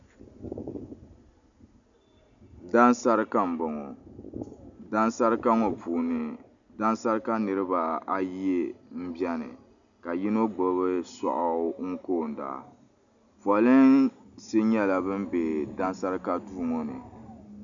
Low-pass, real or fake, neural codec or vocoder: 7.2 kHz; real; none